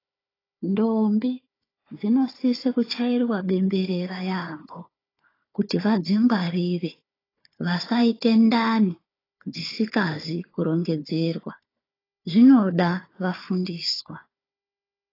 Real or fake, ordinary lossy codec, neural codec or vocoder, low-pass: fake; AAC, 24 kbps; codec, 16 kHz, 4 kbps, FunCodec, trained on Chinese and English, 50 frames a second; 5.4 kHz